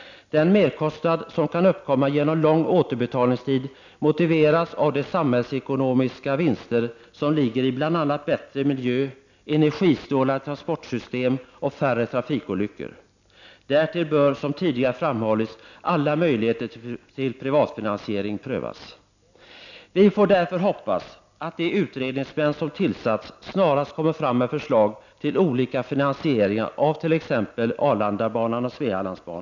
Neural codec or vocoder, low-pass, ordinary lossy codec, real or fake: none; 7.2 kHz; none; real